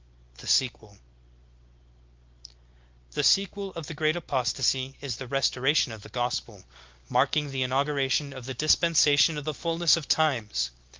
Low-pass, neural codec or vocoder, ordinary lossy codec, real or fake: 7.2 kHz; none; Opus, 32 kbps; real